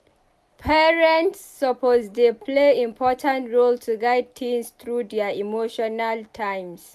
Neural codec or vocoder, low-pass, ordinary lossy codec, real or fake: none; 14.4 kHz; none; real